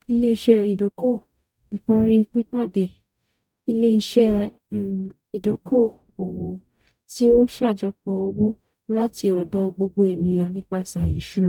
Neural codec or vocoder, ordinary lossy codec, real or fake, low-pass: codec, 44.1 kHz, 0.9 kbps, DAC; none; fake; 19.8 kHz